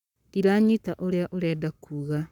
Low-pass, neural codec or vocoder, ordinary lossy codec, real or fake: 19.8 kHz; codec, 44.1 kHz, 7.8 kbps, Pupu-Codec; none; fake